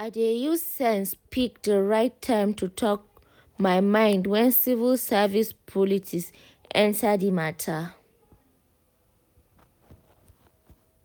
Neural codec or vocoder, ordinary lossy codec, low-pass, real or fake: none; none; none; real